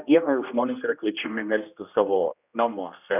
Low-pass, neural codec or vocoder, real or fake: 3.6 kHz; codec, 16 kHz, 1 kbps, X-Codec, HuBERT features, trained on general audio; fake